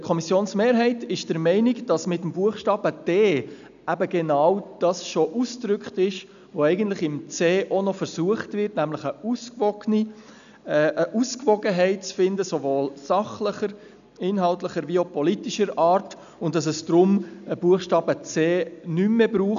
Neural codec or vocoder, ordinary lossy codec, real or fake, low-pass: none; none; real; 7.2 kHz